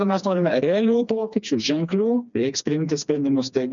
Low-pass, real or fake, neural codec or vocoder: 7.2 kHz; fake; codec, 16 kHz, 2 kbps, FreqCodec, smaller model